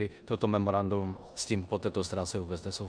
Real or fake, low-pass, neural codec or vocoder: fake; 9.9 kHz; codec, 16 kHz in and 24 kHz out, 0.9 kbps, LongCat-Audio-Codec, four codebook decoder